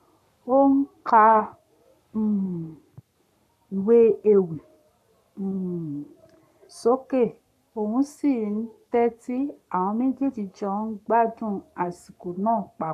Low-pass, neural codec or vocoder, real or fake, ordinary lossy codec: 14.4 kHz; codec, 44.1 kHz, 7.8 kbps, Pupu-Codec; fake; none